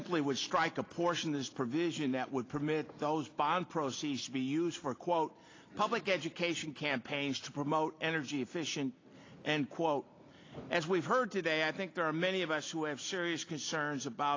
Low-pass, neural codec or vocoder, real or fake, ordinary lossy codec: 7.2 kHz; none; real; AAC, 32 kbps